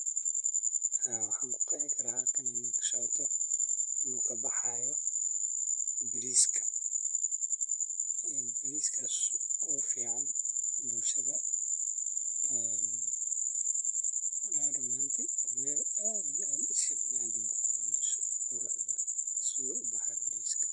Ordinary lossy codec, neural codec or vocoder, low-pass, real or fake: none; vocoder, 44.1 kHz, 128 mel bands, Pupu-Vocoder; 10.8 kHz; fake